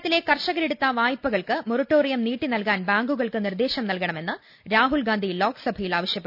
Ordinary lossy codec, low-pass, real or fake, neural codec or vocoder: none; 5.4 kHz; real; none